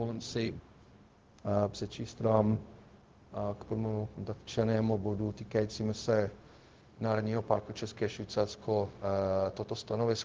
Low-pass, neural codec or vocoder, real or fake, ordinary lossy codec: 7.2 kHz; codec, 16 kHz, 0.4 kbps, LongCat-Audio-Codec; fake; Opus, 16 kbps